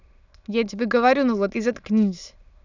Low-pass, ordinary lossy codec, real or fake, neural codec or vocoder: 7.2 kHz; none; fake; autoencoder, 22.05 kHz, a latent of 192 numbers a frame, VITS, trained on many speakers